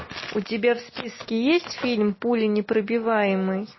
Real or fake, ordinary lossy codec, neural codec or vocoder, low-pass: real; MP3, 24 kbps; none; 7.2 kHz